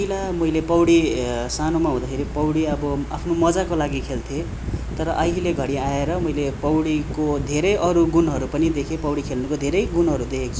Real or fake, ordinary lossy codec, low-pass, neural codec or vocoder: real; none; none; none